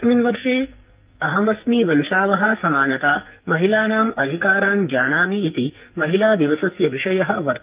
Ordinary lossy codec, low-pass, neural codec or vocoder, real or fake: Opus, 24 kbps; 3.6 kHz; codec, 44.1 kHz, 2.6 kbps, SNAC; fake